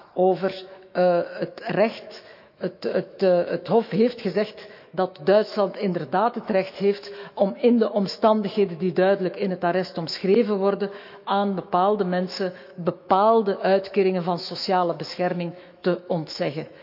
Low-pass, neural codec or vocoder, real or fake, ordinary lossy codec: 5.4 kHz; autoencoder, 48 kHz, 128 numbers a frame, DAC-VAE, trained on Japanese speech; fake; none